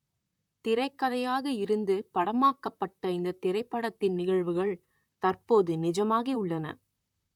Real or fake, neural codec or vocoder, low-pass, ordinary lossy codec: fake; vocoder, 44.1 kHz, 128 mel bands, Pupu-Vocoder; 19.8 kHz; none